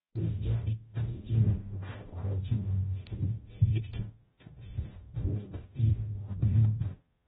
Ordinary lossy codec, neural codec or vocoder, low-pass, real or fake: AAC, 16 kbps; codec, 44.1 kHz, 0.9 kbps, DAC; 19.8 kHz; fake